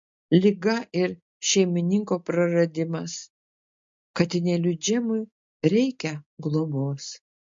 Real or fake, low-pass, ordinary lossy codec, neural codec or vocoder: real; 7.2 kHz; MP3, 48 kbps; none